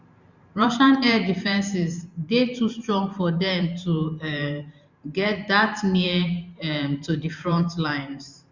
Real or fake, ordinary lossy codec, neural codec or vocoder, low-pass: fake; Opus, 64 kbps; vocoder, 44.1 kHz, 128 mel bands every 512 samples, BigVGAN v2; 7.2 kHz